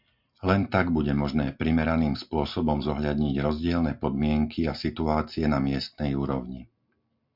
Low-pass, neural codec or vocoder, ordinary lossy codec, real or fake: 5.4 kHz; none; MP3, 48 kbps; real